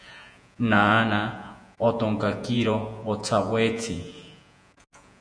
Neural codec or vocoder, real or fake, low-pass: vocoder, 48 kHz, 128 mel bands, Vocos; fake; 9.9 kHz